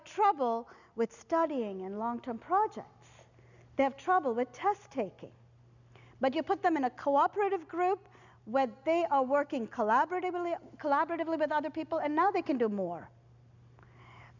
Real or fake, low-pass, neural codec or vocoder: real; 7.2 kHz; none